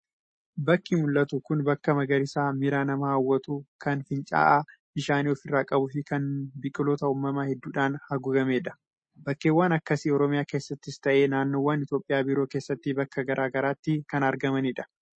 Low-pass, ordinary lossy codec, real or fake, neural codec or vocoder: 9.9 kHz; MP3, 32 kbps; real; none